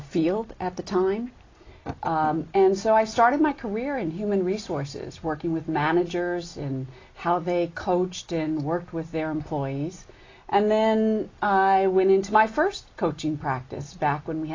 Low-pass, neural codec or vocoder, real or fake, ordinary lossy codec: 7.2 kHz; none; real; AAC, 32 kbps